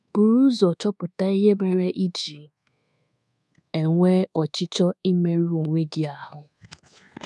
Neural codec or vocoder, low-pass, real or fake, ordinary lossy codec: codec, 24 kHz, 1.2 kbps, DualCodec; none; fake; none